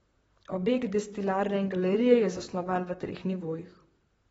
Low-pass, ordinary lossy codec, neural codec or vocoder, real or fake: 19.8 kHz; AAC, 24 kbps; vocoder, 44.1 kHz, 128 mel bands, Pupu-Vocoder; fake